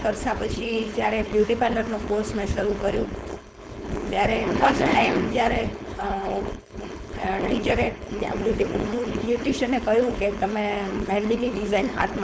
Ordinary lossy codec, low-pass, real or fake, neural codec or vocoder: none; none; fake; codec, 16 kHz, 4.8 kbps, FACodec